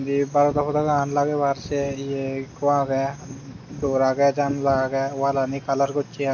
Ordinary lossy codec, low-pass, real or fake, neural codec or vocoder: none; 7.2 kHz; real; none